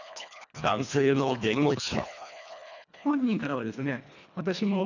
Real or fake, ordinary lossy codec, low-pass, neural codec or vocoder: fake; none; 7.2 kHz; codec, 24 kHz, 1.5 kbps, HILCodec